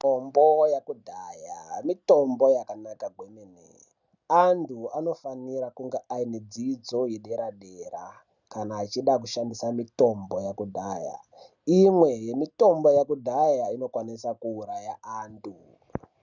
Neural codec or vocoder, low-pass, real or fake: none; 7.2 kHz; real